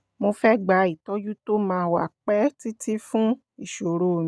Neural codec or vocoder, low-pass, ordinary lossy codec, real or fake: none; none; none; real